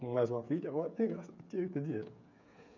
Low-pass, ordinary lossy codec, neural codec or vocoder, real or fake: 7.2 kHz; none; codec, 16 kHz, 8 kbps, FreqCodec, smaller model; fake